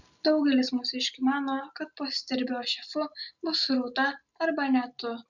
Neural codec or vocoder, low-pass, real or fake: none; 7.2 kHz; real